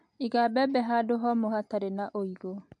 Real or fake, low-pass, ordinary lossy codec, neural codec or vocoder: real; 10.8 kHz; MP3, 96 kbps; none